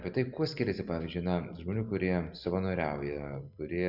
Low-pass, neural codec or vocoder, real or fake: 5.4 kHz; none; real